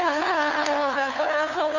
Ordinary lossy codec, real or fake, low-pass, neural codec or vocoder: none; fake; 7.2 kHz; codec, 16 kHz, 2 kbps, FunCodec, trained on LibriTTS, 25 frames a second